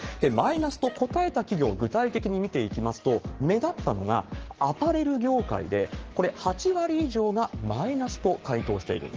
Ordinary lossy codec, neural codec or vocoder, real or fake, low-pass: Opus, 16 kbps; codec, 44.1 kHz, 7.8 kbps, Pupu-Codec; fake; 7.2 kHz